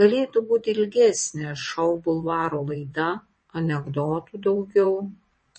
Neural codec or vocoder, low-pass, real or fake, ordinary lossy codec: vocoder, 22.05 kHz, 80 mel bands, WaveNeXt; 9.9 kHz; fake; MP3, 32 kbps